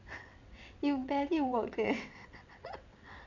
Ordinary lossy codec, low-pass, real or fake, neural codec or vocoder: none; 7.2 kHz; fake; vocoder, 22.05 kHz, 80 mel bands, WaveNeXt